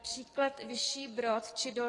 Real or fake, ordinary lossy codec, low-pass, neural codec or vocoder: fake; AAC, 32 kbps; 10.8 kHz; codec, 44.1 kHz, 7.8 kbps, DAC